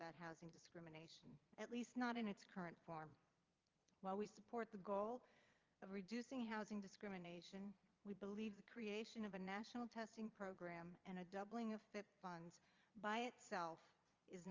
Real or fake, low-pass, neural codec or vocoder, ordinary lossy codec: fake; 7.2 kHz; vocoder, 22.05 kHz, 80 mel bands, Vocos; Opus, 32 kbps